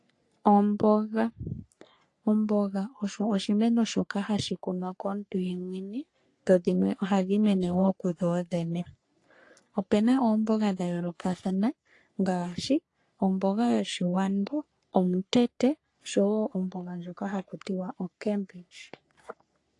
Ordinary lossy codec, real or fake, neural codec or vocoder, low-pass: AAC, 48 kbps; fake; codec, 44.1 kHz, 3.4 kbps, Pupu-Codec; 10.8 kHz